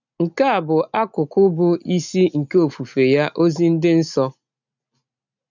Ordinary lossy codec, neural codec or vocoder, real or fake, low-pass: none; none; real; 7.2 kHz